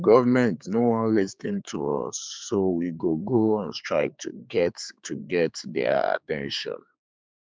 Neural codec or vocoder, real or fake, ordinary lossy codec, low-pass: codec, 16 kHz, 4 kbps, X-Codec, HuBERT features, trained on general audio; fake; none; none